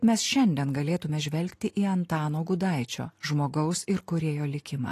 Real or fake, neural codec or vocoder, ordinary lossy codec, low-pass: real; none; AAC, 48 kbps; 14.4 kHz